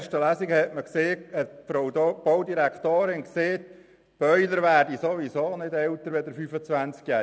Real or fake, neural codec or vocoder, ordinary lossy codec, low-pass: real; none; none; none